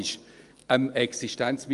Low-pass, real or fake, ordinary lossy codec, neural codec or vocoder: 10.8 kHz; real; Opus, 32 kbps; none